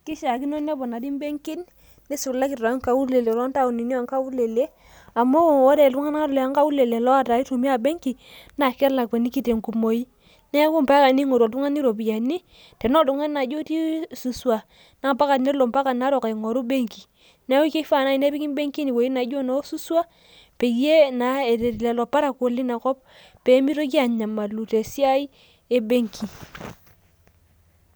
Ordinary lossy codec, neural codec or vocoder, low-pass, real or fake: none; none; none; real